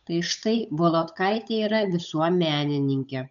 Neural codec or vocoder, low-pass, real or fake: codec, 16 kHz, 8 kbps, FunCodec, trained on Chinese and English, 25 frames a second; 7.2 kHz; fake